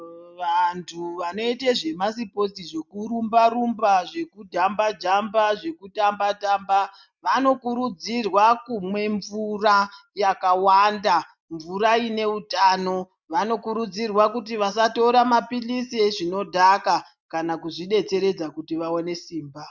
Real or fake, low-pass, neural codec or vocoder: real; 7.2 kHz; none